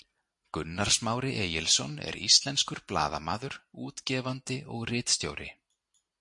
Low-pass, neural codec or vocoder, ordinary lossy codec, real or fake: 10.8 kHz; none; MP3, 48 kbps; real